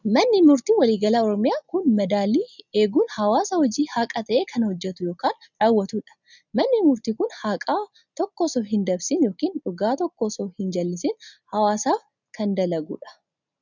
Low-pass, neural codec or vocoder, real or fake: 7.2 kHz; none; real